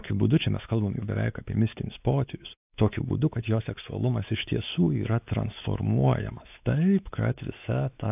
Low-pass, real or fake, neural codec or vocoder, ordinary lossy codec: 3.6 kHz; fake; codec, 16 kHz, 4 kbps, X-Codec, WavLM features, trained on Multilingual LibriSpeech; AAC, 32 kbps